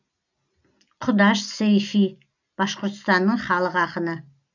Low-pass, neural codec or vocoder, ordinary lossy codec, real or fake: 7.2 kHz; none; none; real